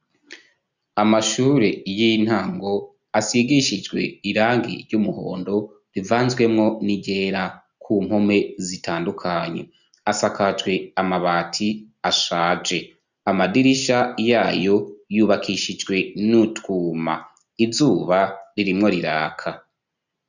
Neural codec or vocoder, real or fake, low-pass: none; real; 7.2 kHz